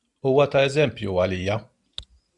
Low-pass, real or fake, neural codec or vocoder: 10.8 kHz; real; none